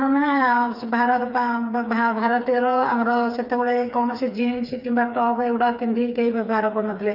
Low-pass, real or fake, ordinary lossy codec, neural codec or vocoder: 5.4 kHz; fake; none; codec, 16 kHz, 4 kbps, FreqCodec, smaller model